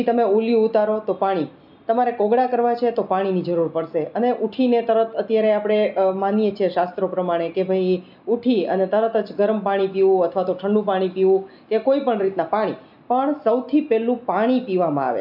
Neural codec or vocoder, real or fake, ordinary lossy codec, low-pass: none; real; none; 5.4 kHz